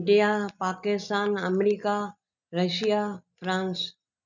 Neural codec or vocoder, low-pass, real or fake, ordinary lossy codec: none; 7.2 kHz; real; none